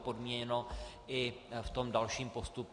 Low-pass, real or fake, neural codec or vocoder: 10.8 kHz; real; none